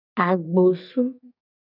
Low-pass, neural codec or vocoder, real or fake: 5.4 kHz; codec, 44.1 kHz, 2.6 kbps, SNAC; fake